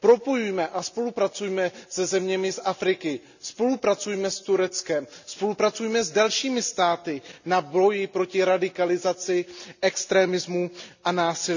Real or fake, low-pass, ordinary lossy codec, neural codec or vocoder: real; 7.2 kHz; none; none